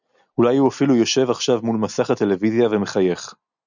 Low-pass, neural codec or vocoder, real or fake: 7.2 kHz; none; real